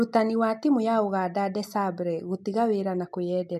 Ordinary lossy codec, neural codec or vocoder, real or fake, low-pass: MP3, 64 kbps; none; real; 14.4 kHz